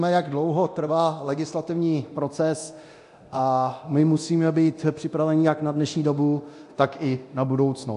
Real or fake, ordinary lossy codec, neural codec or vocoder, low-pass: fake; AAC, 64 kbps; codec, 24 kHz, 0.9 kbps, DualCodec; 10.8 kHz